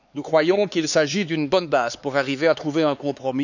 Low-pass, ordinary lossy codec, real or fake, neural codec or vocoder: 7.2 kHz; none; fake; codec, 16 kHz, 4 kbps, X-Codec, HuBERT features, trained on LibriSpeech